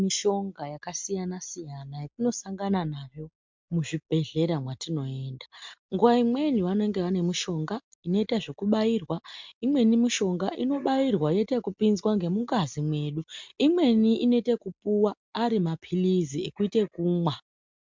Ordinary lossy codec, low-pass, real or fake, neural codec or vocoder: MP3, 64 kbps; 7.2 kHz; real; none